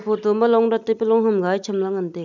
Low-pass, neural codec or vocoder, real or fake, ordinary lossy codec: 7.2 kHz; none; real; none